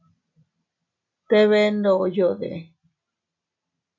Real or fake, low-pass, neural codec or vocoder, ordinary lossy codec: real; 7.2 kHz; none; MP3, 48 kbps